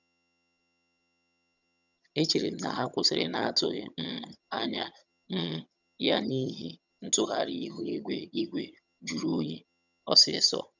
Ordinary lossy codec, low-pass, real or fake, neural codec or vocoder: none; 7.2 kHz; fake; vocoder, 22.05 kHz, 80 mel bands, HiFi-GAN